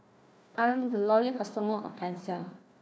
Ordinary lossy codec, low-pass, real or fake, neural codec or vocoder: none; none; fake; codec, 16 kHz, 1 kbps, FunCodec, trained on Chinese and English, 50 frames a second